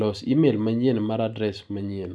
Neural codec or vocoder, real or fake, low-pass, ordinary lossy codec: none; real; none; none